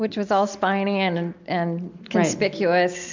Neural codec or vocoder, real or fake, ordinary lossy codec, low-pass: none; real; MP3, 64 kbps; 7.2 kHz